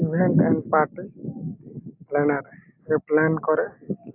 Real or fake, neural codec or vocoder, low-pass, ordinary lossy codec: real; none; 3.6 kHz; AAC, 32 kbps